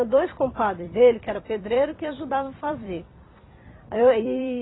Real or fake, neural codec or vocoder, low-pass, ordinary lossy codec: fake; vocoder, 44.1 kHz, 128 mel bands every 256 samples, BigVGAN v2; 7.2 kHz; AAC, 16 kbps